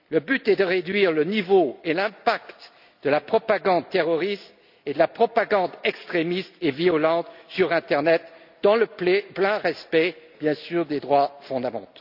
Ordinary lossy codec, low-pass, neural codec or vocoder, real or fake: none; 5.4 kHz; none; real